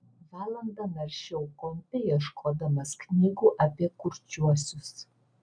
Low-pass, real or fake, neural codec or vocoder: 9.9 kHz; real; none